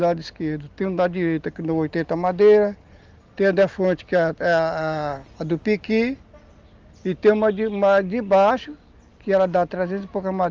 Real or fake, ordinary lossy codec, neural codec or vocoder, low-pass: real; Opus, 32 kbps; none; 7.2 kHz